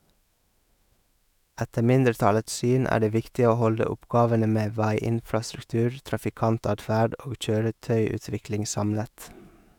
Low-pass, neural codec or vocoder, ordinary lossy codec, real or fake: 19.8 kHz; autoencoder, 48 kHz, 128 numbers a frame, DAC-VAE, trained on Japanese speech; none; fake